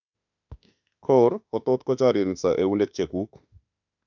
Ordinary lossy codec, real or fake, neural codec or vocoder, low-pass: none; fake; autoencoder, 48 kHz, 32 numbers a frame, DAC-VAE, trained on Japanese speech; 7.2 kHz